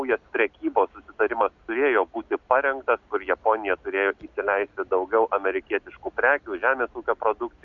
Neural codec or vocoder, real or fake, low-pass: none; real; 7.2 kHz